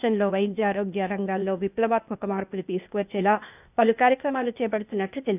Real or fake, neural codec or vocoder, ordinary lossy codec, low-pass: fake; codec, 16 kHz, 0.8 kbps, ZipCodec; none; 3.6 kHz